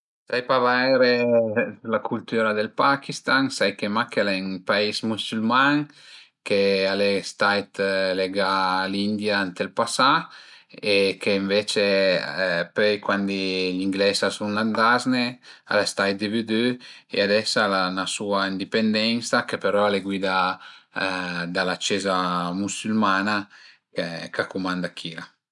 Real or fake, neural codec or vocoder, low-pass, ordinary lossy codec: real; none; 10.8 kHz; none